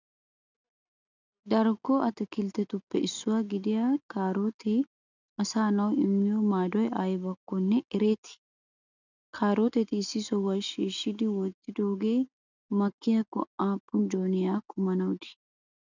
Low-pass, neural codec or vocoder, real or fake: 7.2 kHz; none; real